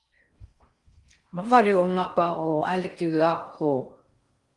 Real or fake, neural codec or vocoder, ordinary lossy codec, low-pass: fake; codec, 16 kHz in and 24 kHz out, 0.6 kbps, FocalCodec, streaming, 4096 codes; Opus, 24 kbps; 10.8 kHz